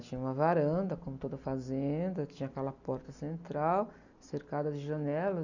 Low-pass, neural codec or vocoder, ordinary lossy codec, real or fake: 7.2 kHz; none; none; real